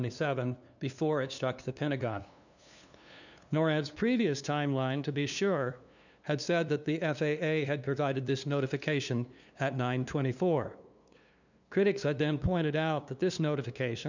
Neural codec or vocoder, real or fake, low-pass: codec, 16 kHz, 2 kbps, FunCodec, trained on LibriTTS, 25 frames a second; fake; 7.2 kHz